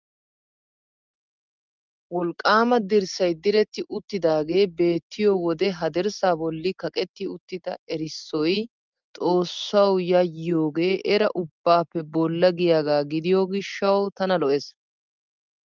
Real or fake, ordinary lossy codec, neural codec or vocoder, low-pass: real; Opus, 24 kbps; none; 7.2 kHz